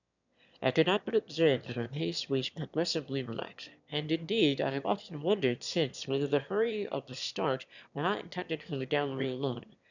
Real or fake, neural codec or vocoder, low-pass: fake; autoencoder, 22.05 kHz, a latent of 192 numbers a frame, VITS, trained on one speaker; 7.2 kHz